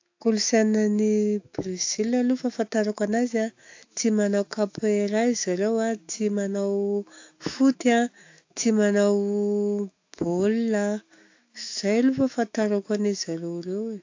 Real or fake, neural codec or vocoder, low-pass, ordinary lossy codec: fake; autoencoder, 48 kHz, 128 numbers a frame, DAC-VAE, trained on Japanese speech; 7.2 kHz; AAC, 48 kbps